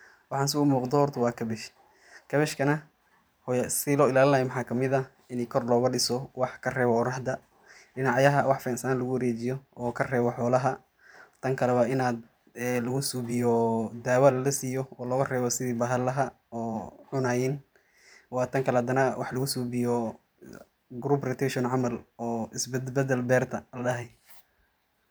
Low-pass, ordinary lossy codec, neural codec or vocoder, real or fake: none; none; vocoder, 44.1 kHz, 128 mel bands every 512 samples, BigVGAN v2; fake